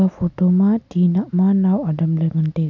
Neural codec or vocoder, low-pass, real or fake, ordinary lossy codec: none; 7.2 kHz; real; none